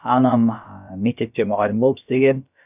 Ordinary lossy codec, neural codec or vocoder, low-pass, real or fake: none; codec, 16 kHz, about 1 kbps, DyCAST, with the encoder's durations; 3.6 kHz; fake